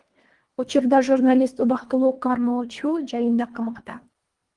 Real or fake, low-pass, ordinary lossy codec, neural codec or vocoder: fake; 10.8 kHz; Opus, 24 kbps; codec, 24 kHz, 1.5 kbps, HILCodec